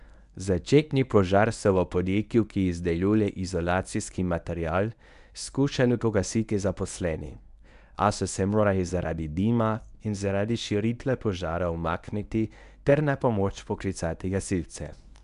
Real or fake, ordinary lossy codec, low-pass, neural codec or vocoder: fake; none; 10.8 kHz; codec, 24 kHz, 0.9 kbps, WavTokenizer, medium speech release version 1